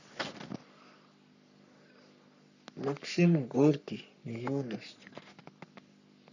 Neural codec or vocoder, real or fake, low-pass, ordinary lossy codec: codec, 44.1 kHz, 3.4 kbps, Pupu-Codec; fake; 7.2 kHz; none